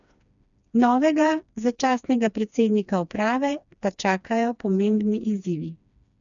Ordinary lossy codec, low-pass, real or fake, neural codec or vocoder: none; 7.2 kHz; fake; codec, 16 kHz, 2 kbps, FreqCodec, smaller model